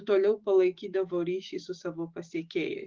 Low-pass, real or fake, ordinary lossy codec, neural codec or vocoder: 7.2 kHz; fake; Opus, 16 kbps; autoencoder, 48 kHz, 128 numbers a frame, DAC-VAE, trained on Japanese speech